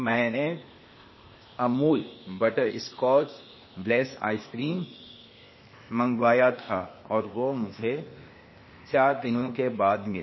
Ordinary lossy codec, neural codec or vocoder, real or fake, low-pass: MP3, 24 kbps; codec, 16 kHz, 0.8 kbps, ZipCodec; fake; 7.2 kHz